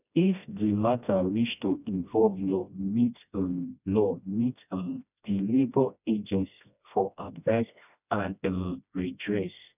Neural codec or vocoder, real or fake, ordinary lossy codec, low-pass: codec, 16 kHz, 1 kbps, FreqCodec, smaller model; fake; none; 3.6 kHz